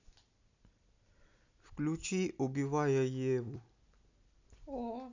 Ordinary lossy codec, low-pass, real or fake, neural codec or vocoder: none; 7.2 kHz; real; none